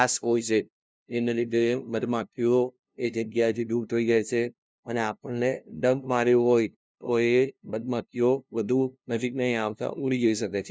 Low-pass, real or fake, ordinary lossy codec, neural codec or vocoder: none; fake; none; codec, 16 kHz, 0.5 kbps, FunCodec, trained on LibriTTS, 25 frames a second